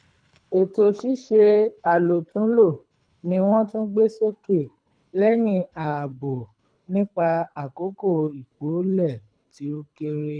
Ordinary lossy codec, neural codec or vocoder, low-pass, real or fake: none; codec, 24 kHz, 3 kbps, HILCodec; 9.9 kHz; fake